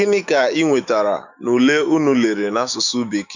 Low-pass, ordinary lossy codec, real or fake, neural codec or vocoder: 7.2 kHz; none; fake; autoencoder, 48 kHz, 128 numbers a frame, DAC-VAE, trained on Japanese speech